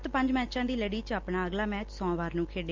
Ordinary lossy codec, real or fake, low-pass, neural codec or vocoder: Opus, 32 kbps; real; 7.2 kHz; none